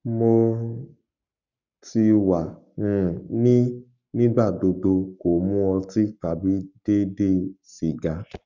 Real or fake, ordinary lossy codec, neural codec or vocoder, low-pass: fake; none; codec, 44.1 kHz, 7.8 kbps, Pupu-Codec; 7.2 kHz